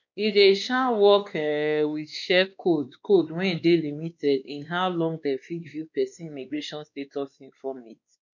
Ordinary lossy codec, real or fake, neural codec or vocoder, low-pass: none; fake; codec, 16 kHz, 2 kbps, X-Codec, WavLM features, trained on Multilingual LibriSpeech; 7.2 kHz